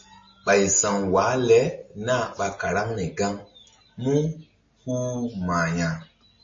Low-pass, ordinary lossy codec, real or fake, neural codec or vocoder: 7.2 kHz; MP3, 32 kbps; real; none